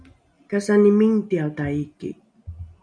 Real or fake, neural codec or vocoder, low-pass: real; none; 9.9 kHz